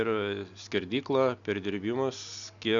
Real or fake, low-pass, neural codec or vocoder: real; 7.2 kHz; none